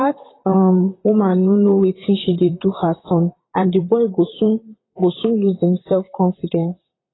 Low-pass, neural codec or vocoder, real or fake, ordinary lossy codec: 7.2 kHz; vocoder, 44.1 kHz, 128 mel bands, Pupu-Vocoder; fake; AAC, 16 kbps